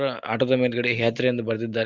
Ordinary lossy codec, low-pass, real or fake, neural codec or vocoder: Opus, 32 kbps; 7.2 kHz; real; none